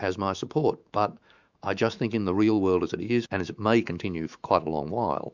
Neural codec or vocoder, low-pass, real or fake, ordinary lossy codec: autoencoder, 48 kHz, 128 numbers a frame, DAC-VAE, trained on Japanese speech; 7.2 kHz; fake; Opus, 64 kbps